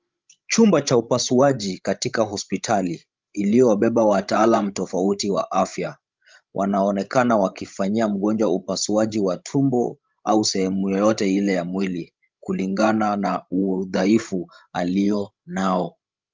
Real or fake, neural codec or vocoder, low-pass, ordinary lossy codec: fake; codec, 16 kHz, 16 kbps, FreqCodec, larger model; 7.2 kHz; Opus, 24 kbps